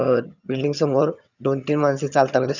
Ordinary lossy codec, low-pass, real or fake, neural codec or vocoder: none; 7.2 kHz; fake; vocoder, 22.05 kHz, 80 mel bands, HiFi-GAN